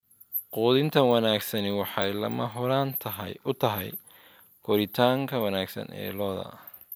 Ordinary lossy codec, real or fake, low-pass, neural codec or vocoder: none; real; none; none